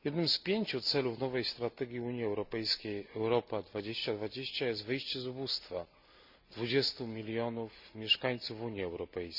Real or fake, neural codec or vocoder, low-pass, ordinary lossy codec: real; none; 5.4 kHz; none